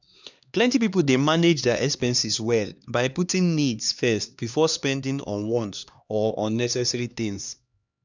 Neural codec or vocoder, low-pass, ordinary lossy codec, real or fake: codec, 16 kHz, 2 kbps, X-Codec, HuBERT features, trained on LibriSpeech; 7.2 kHz; none; fake